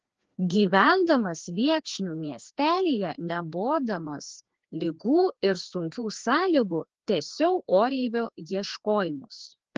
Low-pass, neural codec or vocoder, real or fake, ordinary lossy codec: 7.2 kHz; codec, 16 kHz, 2 kbps, FreqCodec, larger model; fake; Opus, 16 kbps